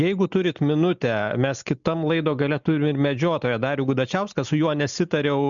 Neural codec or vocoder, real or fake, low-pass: none; real; 7.2 kHz